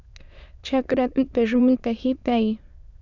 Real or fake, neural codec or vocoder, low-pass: fake; autoencoder, 22.05 kHz, a latent of 192 numbers a frame, VITS, trained on many speakers; 7.2 kHz